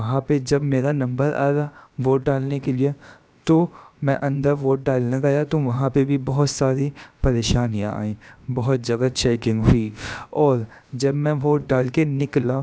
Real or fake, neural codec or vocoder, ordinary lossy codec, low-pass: fake; codec, 16 kHz, about 1 kbps, DyCAST, with the encoder's durations; none; none